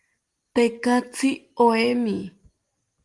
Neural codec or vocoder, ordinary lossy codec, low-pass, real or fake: none; Opus, 24 kbps; 10.8 kHz; real